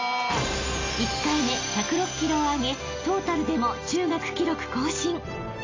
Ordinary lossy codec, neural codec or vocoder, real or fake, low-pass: AAC, 32 kbps; none; real; 7.2 kHz